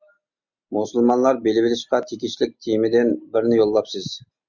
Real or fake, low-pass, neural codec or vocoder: real; 7.2 kHz; none